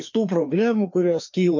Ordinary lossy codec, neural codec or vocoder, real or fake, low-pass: MP3, 64 kbps; codec, 16 kHz, 2 kbps, FreqCodec, larger model; fake; 7.2 kHz